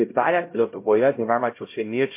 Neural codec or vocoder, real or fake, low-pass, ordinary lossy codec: codec, 16 kHz, 0.5 kbps, X-Codec, HuBERT features, trained on LibriSpeech; fake; 3.6 kHz; MP3, 24 kbps